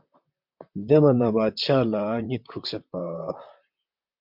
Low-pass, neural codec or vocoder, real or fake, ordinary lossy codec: 5.4 kHz; vocoder, 44.1 kHz, 128 mel bands, Pupu-Vocoder; fake; MP3, 48 kbps